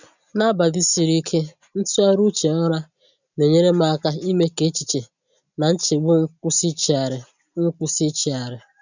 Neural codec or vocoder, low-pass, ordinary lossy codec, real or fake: none; 7.2 kHz; none; real